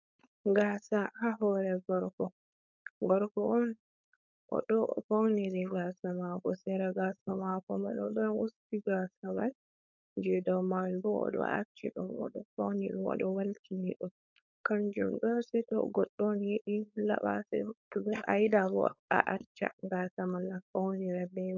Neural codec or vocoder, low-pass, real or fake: codec, 16 kHz, 4.8 kbps, FACodec; 7.2 kHz; fake